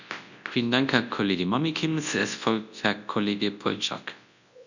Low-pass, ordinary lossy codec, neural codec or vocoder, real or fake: 7.2 kHz; none; codec, 24 kHz, 0.9 kbps, WavTokenizer, large speech release; fake